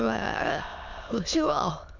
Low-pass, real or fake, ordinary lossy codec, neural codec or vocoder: 7.2 kHz; fake; none; autoencoder, 22.05 kHz, a latent of 192 numbers a frame, VITS, trained on many speakers